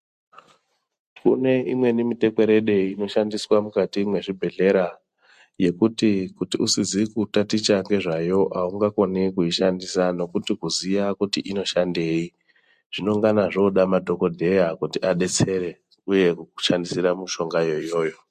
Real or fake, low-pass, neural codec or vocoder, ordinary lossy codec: real; 14.4 kHz; none; MP3, 64 kbps